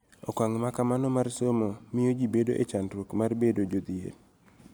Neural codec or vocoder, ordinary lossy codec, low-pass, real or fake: none; none; none; real